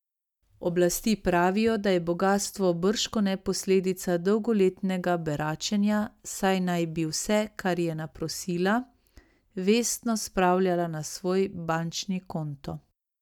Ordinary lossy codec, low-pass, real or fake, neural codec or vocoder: none; 19.8 kHz; real; none